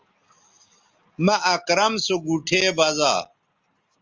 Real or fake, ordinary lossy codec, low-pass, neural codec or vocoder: real; Opus, 32 kbps; 7.2 kHz; none